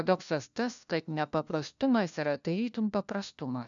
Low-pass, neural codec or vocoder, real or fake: 7.2 kHz; codec, 16 kHz, 1 kbps, FunCodec, trained on LibriTTS, 50 frames a second; fake